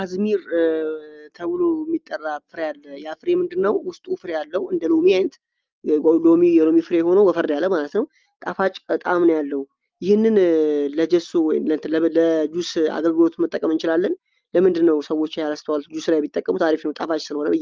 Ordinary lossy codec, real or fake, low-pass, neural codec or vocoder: Opus, 32 kbps; real; 7.2 kHz; none